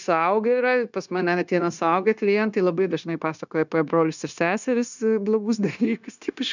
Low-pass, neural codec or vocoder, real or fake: 7.2 kHz; codec, 16 kHz, 0.9 kbps, LongCat-Audio-Codec; fake